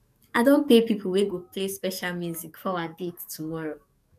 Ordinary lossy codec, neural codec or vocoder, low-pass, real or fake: none; codec, 44.1 kHz, 7.8 kbps, DAC; 14.4 kHz; fake